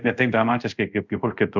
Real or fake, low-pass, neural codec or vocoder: fake; 7.2 kHz; codec, 24 kHz, 0.5 kbps, DualCodec